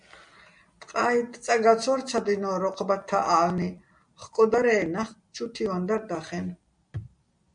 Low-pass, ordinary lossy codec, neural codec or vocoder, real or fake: 9.9 kHz; MP3, 64 kbps; none; real